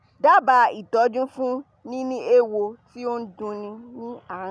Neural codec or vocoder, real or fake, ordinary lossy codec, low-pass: none; real; none; none